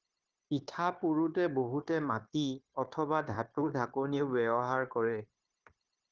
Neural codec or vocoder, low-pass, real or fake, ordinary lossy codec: codec, 16 kHz, 0.9 kbps, LongCat-Audio-Codec; 7.2 kHz; fake; Opus, 16 kbps